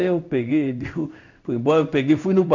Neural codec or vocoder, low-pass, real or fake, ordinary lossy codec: codec, 16 kHz in and 24 kHz out, 1 kbps, XY-Tokenizer; 7.2 kHz; fake; none